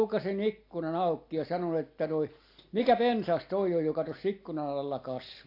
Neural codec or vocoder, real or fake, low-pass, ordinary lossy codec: none; real; 5.4 kHz; AAC, 32 kbps